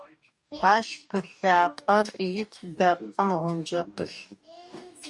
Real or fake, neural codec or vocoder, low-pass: fake; codec, 44.1 kHz, 2.6 kbps, DAC; 10.8 kHz